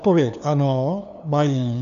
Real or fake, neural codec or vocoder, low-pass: fake; codec, 16 kHz, 2 kbps, FunCodec, trained on LibriTTS, 25 frames a second; 7.2 kHz